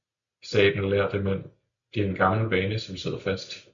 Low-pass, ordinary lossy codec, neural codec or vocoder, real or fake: 7.2 kHz; MP3, 64 kbps; none; real